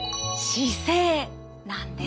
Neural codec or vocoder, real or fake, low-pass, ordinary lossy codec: none; real; none; none